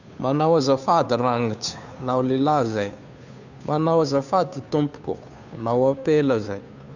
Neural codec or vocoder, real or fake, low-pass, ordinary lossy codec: codec, 16 kHz, 2 kbps, FunCodec, trained on Chinese and English, 25 frames a second; fake; 7.2 kHz; none